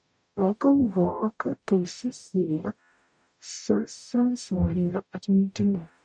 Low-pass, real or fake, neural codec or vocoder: 9.9 kHz; fake; codec, 44.1 kHz, 0.9 kbps, DAC